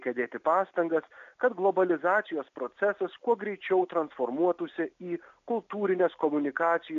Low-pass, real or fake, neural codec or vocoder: 7.2 kHz; real; none